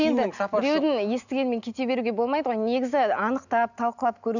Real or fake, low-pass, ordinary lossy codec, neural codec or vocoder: real; 7.2 kHz; none; none